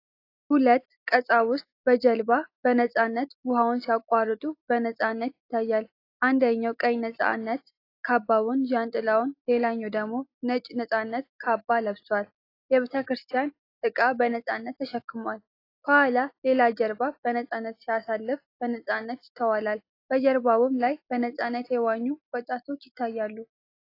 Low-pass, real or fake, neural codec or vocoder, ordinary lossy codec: 5.4 kHz; real; none; AAC, 32 kbps